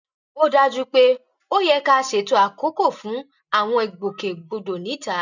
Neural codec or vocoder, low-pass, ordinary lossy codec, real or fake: none; 7.2 kHz; none; real